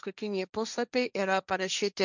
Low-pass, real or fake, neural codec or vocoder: 7.2 kHz; fake; codec, 16 kHz, 1.1 kbps, Voila-Tokenizer